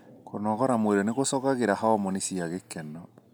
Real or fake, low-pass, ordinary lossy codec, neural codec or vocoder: real; none; none; none